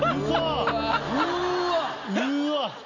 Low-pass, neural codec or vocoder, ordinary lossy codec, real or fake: 7.2 kHz; none; none; real